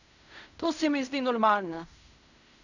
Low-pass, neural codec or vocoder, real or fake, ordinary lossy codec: 7.2 kHz; codec, 16 kHz in and 24 kHz out, 0.4 kbps, LongCat-Audio-Codec, fine tuned four codebook decoder; fake; none